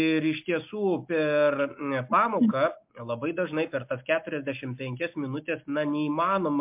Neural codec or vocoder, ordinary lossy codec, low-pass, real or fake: none; MP3, 32 kbps; 3.6 kHz; real